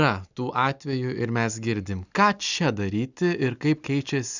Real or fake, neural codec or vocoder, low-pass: real; none; 7.2 kHz